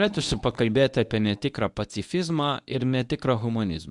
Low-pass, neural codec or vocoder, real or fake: 10.8 kHz; codec, 24 kHz, 0.9 kbps, WavTokenizer, medium speech release version 1; fake